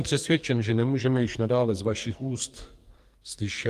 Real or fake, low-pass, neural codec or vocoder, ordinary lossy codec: fake; 14.4 kHz; codec, 44.1 kHz, 2.6 kbps, SNAC; Opus, 32 kbps